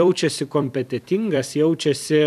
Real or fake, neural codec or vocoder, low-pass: fake; vocoder, 44.1 kHz, 128 mel bands every 256 samples, BigVGAN v2; 14.4 kHz